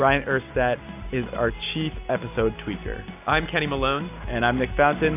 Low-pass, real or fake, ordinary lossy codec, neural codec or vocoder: 3.6 kHz; real; AAC, 32 kbps; none